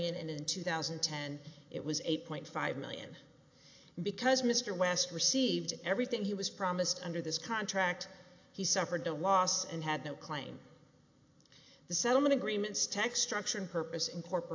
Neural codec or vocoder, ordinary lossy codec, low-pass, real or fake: none; AAC, 48 kbps; 7.2 kHz; real